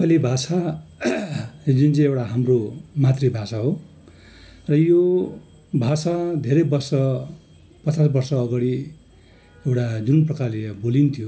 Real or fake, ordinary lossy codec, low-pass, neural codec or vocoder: real; none; none; none